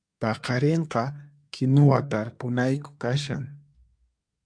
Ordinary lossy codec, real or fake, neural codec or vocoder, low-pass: MP3, 64 kbps; fake; codec, 24 kHz, 1 kbps, SNAC; 9.9 kHz